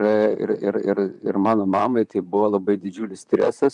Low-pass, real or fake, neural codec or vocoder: 10.8 kHz; fake; vocoder, 44.1 kHz, 128 mel bands, Pupu-Vocoder